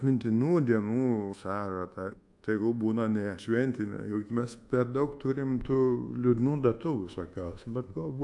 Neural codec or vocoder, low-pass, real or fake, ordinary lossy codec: codec, 24 kHz, 1.2 kbps, DualCodec; 10.8 kHz; fake; AAC, 64 kbps